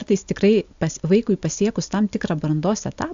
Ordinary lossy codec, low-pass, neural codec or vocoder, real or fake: AAC, 64 kbps; 7.2 kHz; none; real